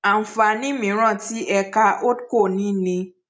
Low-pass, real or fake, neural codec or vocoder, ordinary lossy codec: none; real; none; none